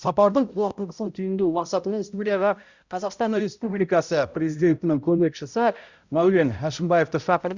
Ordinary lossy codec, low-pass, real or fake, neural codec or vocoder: Opus, 64 kbps; 7.2 kHz; fake; codec, 16 kHz, 0.5 kbps, X-Codec, HuBERT features, trained on balanced general audio